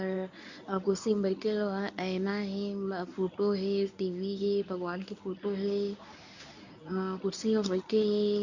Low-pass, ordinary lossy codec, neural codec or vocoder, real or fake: 7.2 kHz; none; codec, 24 kHz, 0.9 kbps, WavTokenizer, medium speech release version 1; fake